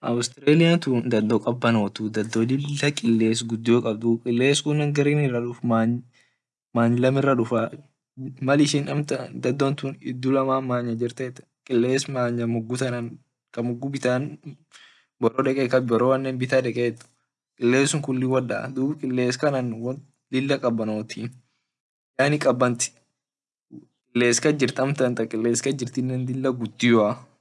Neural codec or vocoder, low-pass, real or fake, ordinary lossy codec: none; none; real; none